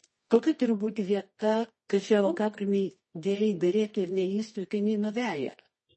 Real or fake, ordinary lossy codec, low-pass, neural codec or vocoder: fake; MP3, 32 kbps; 10.8 kHz; codec, 24 kHz, 0.9 kbps, WavTokenizer, medium music audio release